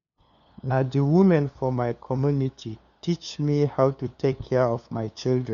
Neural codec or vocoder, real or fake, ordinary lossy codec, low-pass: codec, 16 kHz, 2 kbps, FunCodec, trained on LibriTTS, 25 frames a second; fake; none; 7.2 kHz